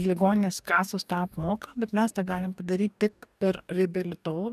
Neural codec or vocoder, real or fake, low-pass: codec, 44.1 kHz, 2.6 kbps, DAC; fake; 14.4 kHz